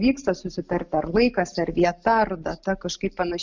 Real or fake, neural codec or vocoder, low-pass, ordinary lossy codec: real; none; 7.2 kHz; Opus, 64 kbps